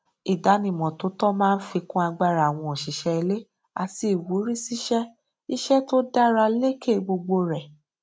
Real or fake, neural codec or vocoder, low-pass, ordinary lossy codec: real; none; none; none